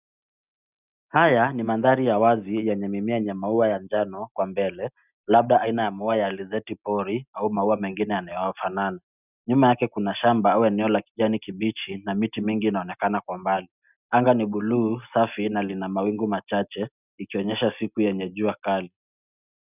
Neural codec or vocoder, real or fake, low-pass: none; real; 3.6 kHz